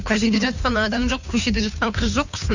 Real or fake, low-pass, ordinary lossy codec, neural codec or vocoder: fake; 7.2 kHz; none; codec, 16 kHz, 2 kbps, FunCodec, trained on Chinese and English, 25 frames a second